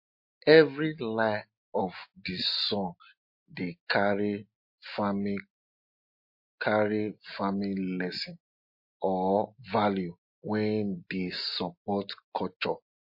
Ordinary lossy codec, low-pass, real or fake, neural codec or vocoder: MP3, 32 kbps; 5.4 kHz; real; none